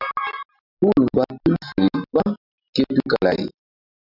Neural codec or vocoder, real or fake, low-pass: none; real; 5.4 kHz